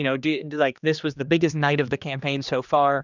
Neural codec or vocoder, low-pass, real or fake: codec, 16 kHz, 2 kbps, X-Codec, HuBERT features, trained on general audio; 7.2 kHz; fake